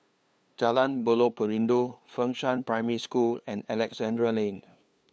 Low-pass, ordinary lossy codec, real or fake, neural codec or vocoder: none; none; fake; codec, 16 kHz, 2 kbps, FunCodec, trained on LibriTTS, 25 frames a second